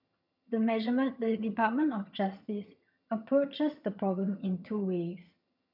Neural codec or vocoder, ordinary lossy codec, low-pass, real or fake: vocoder, 22.05 kHz, 80 mel bands, HiFi-GAN; none; 5.4 kHz; fake